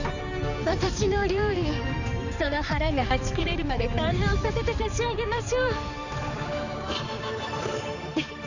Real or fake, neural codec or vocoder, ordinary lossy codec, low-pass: fake; codec, 16 kHz, 4 kbps, X-Codec, HuBERT features, trained on balanced general audio; none; 7.2 kHz